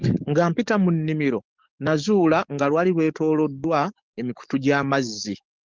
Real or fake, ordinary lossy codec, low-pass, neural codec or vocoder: fake; Opus, 32 kbps; 7.2 kHz; codec, 44.1 kHz, 7.8 kbps, DAC